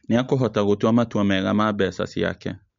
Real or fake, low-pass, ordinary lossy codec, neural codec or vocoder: real; 7.2 kHz; MP3, 48 kbps; none